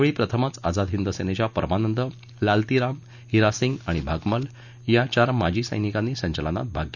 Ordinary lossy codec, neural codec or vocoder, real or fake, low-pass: none; none; real; 7.2 kHz